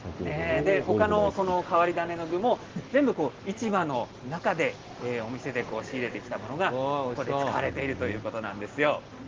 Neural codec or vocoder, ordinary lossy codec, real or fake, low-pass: none; Opus, 16 kbps; real; 7.2 kHz